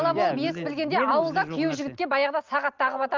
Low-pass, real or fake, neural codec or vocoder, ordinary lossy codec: 7.2 kHz; real; none; Opus, 24 kbps